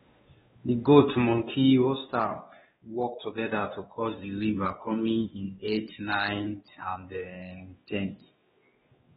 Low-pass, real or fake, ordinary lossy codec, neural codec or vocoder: 7.2 kHz; fake; AAC, 16 kbps; codec, 16 kHz, 2 kbps, X-Codec, WavLM features, trained on Multilingual LibriSpeech